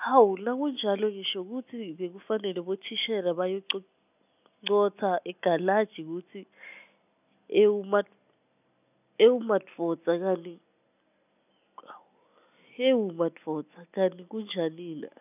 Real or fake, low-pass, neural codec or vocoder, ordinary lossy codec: real; 3.6 kHz; none; none